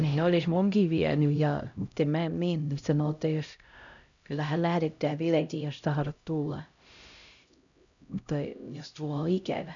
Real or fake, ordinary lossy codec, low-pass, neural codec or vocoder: fake; none; 7.2 kHz; codec, 16 kHz, 0.5 kbps, X-Codec, HuBERT features, trained on LibriSpeech